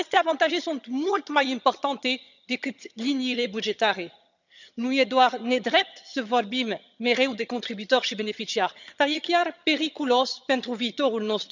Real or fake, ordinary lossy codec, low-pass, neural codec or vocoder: fake; none; 7.2 kHz; vocoder, 22.05 kHz, 80 mel bands, HiFi-GAN